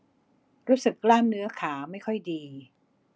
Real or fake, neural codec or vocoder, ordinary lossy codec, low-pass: real; none; none; none